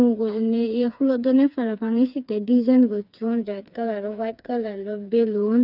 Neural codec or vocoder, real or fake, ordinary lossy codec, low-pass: codec, 16 kHz, 4 kbps, FreqCodec, smaller model; fake; none; 5.4 kHz